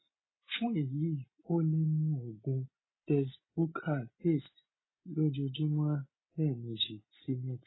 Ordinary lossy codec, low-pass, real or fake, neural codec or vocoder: AAC, 16 kbps; 7.2 kHz; real; none